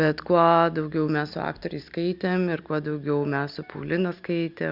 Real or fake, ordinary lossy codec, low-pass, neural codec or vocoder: real; Opus, 64 kbps; 5.4 kHz; none